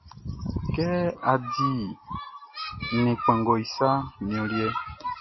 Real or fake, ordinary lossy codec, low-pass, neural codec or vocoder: real; MP3, 24 kbps; 7.2 kHz; none